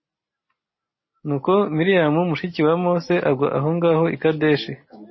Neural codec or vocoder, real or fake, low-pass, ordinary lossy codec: none; real; 7.2 kHz; MP3, 24 kbps